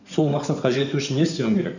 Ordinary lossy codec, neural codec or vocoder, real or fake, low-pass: none; codec, 16 kHz in and 24 kHz out, 2.2 kbps, FireRedTTS-2 codec; fake; 7.2 kHz